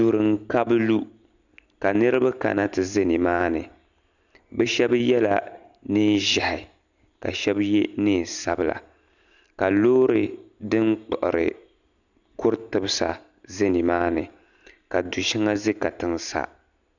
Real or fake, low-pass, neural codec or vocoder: real; 7.2 kHz; none